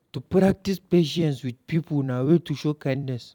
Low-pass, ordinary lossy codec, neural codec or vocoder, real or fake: 19.8 kHz; none; vocoder, 44.1 kHz, 128 mel bands every 256 samples, BigVGAN v2; fake